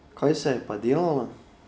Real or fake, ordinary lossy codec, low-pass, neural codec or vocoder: real; none; none; none